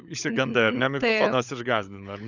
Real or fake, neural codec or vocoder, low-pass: fake; codec, 16 kHz, 16 kbps, FunCodec, trained on LibriTTS, 50 frames a second; 7.2 kHz